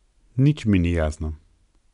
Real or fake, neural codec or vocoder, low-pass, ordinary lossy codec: real; none; 10.8 kHz; none